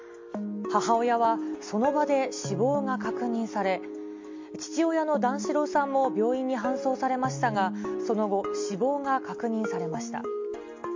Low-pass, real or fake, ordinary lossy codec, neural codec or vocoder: 7.2 kHz; real; MP3, 48 kbps; none